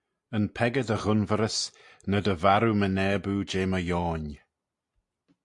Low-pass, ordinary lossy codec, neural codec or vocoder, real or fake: 10.8 kHz; AAC, 64 kbps; none; real